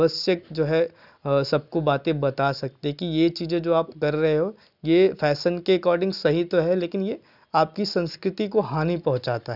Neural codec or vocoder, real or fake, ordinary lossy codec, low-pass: none; real; none; 5.4 kHz